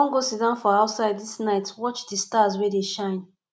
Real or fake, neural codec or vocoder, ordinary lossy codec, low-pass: real; none; none; none